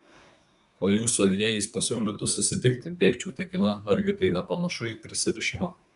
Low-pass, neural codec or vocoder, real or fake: 10.8 kHz; codec, 24 kHz, 1 kbps, SNAC; fake